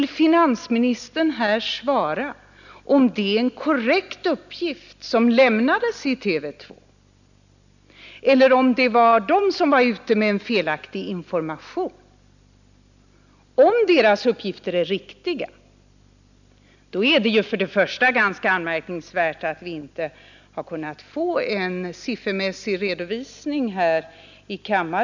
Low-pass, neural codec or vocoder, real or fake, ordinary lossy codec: 7.2 kHz; none; real; none